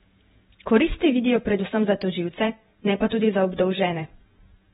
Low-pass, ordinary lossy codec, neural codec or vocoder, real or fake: 19.8 kHz; AAC, 16 kbps; vocoder, 48 kHz, 128 mel bands, Vocos; fake